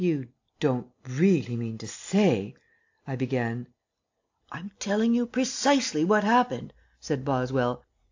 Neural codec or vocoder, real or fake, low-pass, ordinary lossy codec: none; real; 7.2 kHz; AAC, 48 kbps